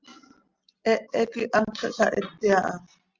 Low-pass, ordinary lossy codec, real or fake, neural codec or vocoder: 7.2 kHz; Opus, 32 kbps; real; none